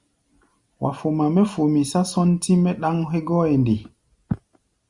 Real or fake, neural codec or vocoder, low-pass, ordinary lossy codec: real; none; 10.8 kHz; Opus, 64 kbps